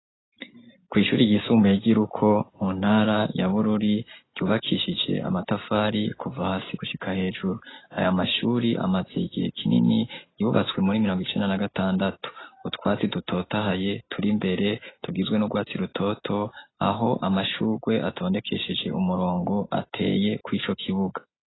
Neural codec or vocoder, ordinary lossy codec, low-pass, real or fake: none; AAC, 16 kbps; 7.2 kHz; real